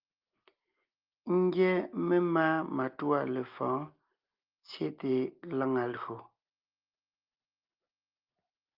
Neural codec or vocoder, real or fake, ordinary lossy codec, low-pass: none; real; Opus, 32 kbps; 5.4 kHz